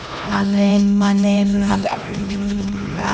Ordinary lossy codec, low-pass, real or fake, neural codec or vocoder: none; none; fake; codec, 16 kHz, 1 kbps, X-Codec, HuBERT features, trained on LibriSpeech